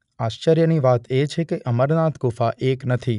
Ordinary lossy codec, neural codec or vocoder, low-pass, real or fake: none; none; 10.8 kHz; real